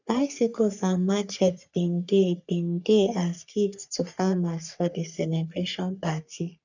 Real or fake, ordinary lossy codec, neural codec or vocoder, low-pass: fake; none; codec, 44.1 kHz, 3.4 kbps, Pupu-Codec; 7.2 kHz